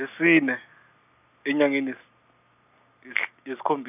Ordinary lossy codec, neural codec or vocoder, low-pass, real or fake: none; none; 3.6 kHz; real